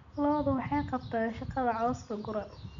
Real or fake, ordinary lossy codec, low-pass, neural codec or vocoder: real; none; 7.2 kHz; none